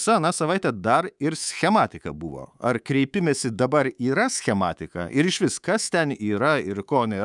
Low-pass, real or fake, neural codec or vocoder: 10.8 kHz; fake; codec, 24 kHz, 3.1 kbps, DualCodec